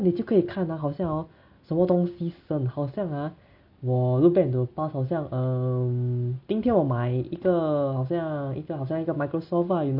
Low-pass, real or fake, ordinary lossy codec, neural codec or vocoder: 5.4 kHz; real; none; none